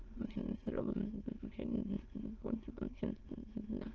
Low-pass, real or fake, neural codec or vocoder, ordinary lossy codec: 7.2 kHz; fake; autoencoder, 22.05 kHz, a latent of 192 numbers a frame, VITS, trained on many speakers; Opus, 16 kbps